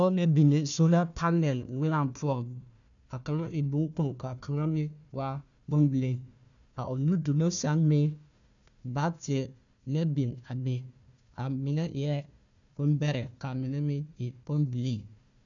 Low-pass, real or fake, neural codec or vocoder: 7.2 kHz; fake; codec, 16 kHz, 1 kbps, FunCodec, trained on Chinese and English, 50 frames a second